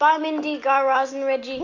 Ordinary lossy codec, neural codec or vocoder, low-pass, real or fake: AAC, 48 kbps; none; 7.2 kHz; real